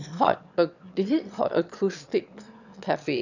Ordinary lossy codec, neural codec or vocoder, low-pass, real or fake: none; autoencoder, 22.05 kHz, a latent of 192 numbers a frame, VITS, trained on one speaker; 7.2 kHz; fake